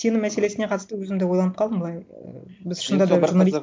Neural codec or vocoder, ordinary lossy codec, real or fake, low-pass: none; none; real; none